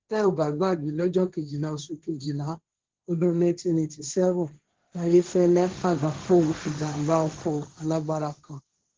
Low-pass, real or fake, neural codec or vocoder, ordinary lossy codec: 7.2 kHz; fake; codec, 16 kHz, 1.1 kbps, Voila-Tokenizer; Opus, 16 kbps